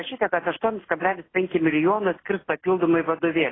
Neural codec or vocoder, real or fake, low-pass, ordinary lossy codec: none; real; 7.2 kHz; AAC, 16 kbps